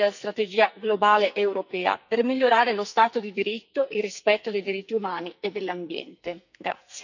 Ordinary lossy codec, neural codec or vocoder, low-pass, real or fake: none; codec, 44.1 kHz, 2.6 kbps, SNAC; 7.2 kHz; fake